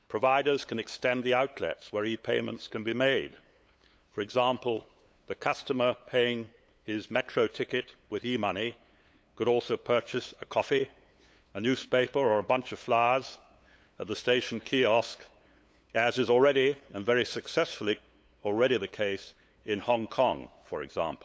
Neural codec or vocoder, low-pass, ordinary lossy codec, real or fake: codec, 16 kHz, 8 kbps, FunCodec, trained on LibriTTS, 25 frames a second; none; none; fake